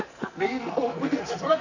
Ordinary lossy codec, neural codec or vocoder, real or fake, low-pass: none; codec, 44.1 kHz, 2.6 kbps, SNAC; fake; 7.2 kHz